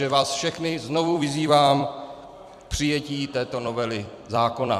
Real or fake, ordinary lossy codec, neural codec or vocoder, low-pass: fake; MP3, 96 kbps; vocoder, 44.1 kHz, 128 mel bands every 256 samples, BigVGAN v2; 14.4 kHz